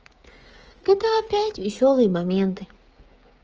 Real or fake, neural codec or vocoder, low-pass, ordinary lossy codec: fake; codec, 16 kHz, 16 kbps, FreqCodec, smaller model; 7.2 kHz; Opus, 24 kbps